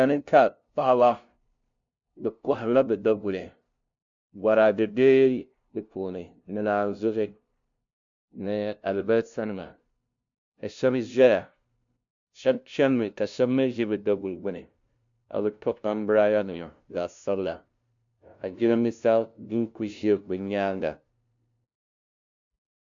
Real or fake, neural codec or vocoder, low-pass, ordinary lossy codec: fake; codec, 16 kHz, 0.5 kbps, FunCodec, trained on LibriTTS, 25 frames a second; 7.2 kHz; MP3, 64 kbps